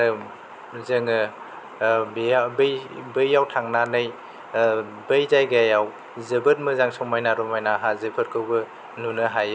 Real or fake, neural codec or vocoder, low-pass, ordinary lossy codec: real; none; none; none